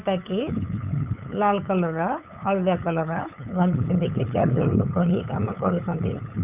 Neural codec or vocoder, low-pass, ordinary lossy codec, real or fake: codec, 16 kHz, 8 kbps, FunCodec, trained on LibriTTS, 25 frames a second; 3.6 kHz; none; fake